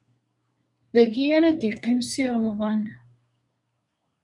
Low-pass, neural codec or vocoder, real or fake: 10.8 kHz; codec, 24 kHz, 1 kbps, SNAC; fake